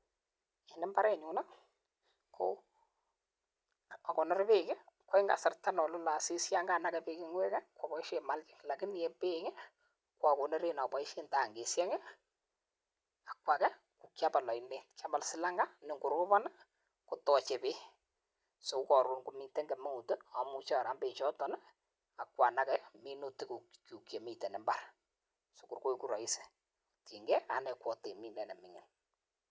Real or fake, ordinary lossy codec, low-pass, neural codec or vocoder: real; none; none; none